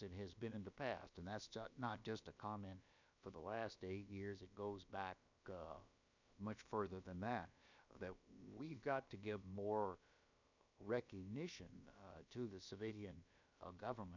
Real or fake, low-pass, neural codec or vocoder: fake; 7.2 kHz; codec, 16 kHz, about 1 kbps, DyCAST, with the encoder's durations